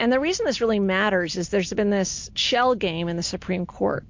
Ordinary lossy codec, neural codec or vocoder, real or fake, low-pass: MP3, 48 kbps; none; real; 7.2 kHz